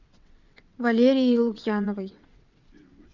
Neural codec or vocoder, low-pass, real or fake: vocoder, 22.05 kHz, 80 mel bands, WaveNeXt; 7.2 kHz; fake